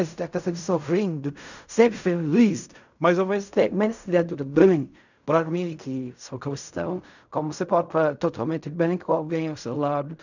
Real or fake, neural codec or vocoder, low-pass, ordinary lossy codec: fake; codec, 16 kHz in and 24 kHz out, 0.4 kbps, LongCat-Audio-Codec, fine tuned four codebook decoder; 7.2 kHz; none